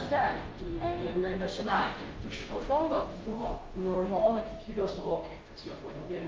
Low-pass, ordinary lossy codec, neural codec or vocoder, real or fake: 7.2 kHz; Opus, 32 kbps; codec, 16 kHz, 0.5 kbps, FunCodec, trained on Chinese and English, 25 frames a second; fake